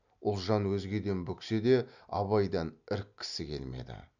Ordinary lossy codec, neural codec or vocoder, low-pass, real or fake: none; none; 7.2 kHz; real